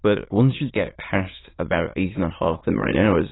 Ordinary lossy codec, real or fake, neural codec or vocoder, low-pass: AAC, 16 kbps; fake; autoencoder, 22.05 kHz, a latent of 192 numbers a frame, VITS, trained on many speakers; 7.2 kHz